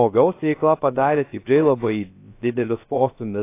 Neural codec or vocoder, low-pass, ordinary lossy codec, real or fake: codec, 16 kHz, 0.3 kbps, FocalCodec; 3.6 kHz; AAC, 24 kbps; fake